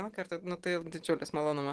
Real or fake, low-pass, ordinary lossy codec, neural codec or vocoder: real; 10.8 kHz; Opus, 16 kbps; none